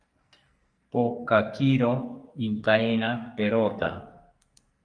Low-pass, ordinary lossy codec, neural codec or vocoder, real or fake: 9.9 kHz; Opus, 32 kbps; codec, 44.1 kHz, 2.6 kbps, SNAC; fake